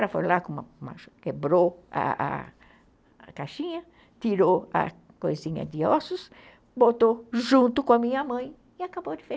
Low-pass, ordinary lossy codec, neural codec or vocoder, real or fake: none; none; none; real